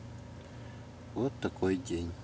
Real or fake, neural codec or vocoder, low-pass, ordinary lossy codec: real; none; none; none